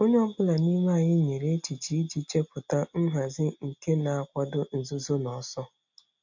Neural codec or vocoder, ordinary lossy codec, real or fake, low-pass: none; MP3, 64 kbps; real; 7.2 kHz